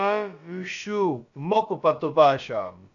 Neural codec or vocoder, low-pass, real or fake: codec, 16 kHz, about 1 kbps, DyCAST, with the encoder's durations; 7.2 kHz; fake